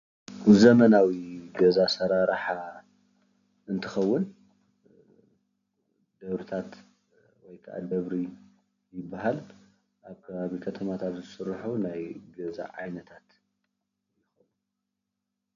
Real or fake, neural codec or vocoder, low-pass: real; none; 7.2 kHz